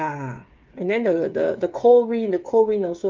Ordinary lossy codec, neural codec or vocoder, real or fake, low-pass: Opus, 24 kbps; codec, 16 kHz, 8 kbps, FreqCodec, smaller model; fake; 7.2 kHz